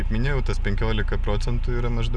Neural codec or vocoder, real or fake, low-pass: none; real; 9.9 kHz